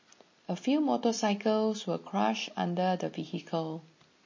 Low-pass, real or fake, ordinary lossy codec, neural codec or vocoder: 7.2 kHz; real; MP3, 32 kbps; none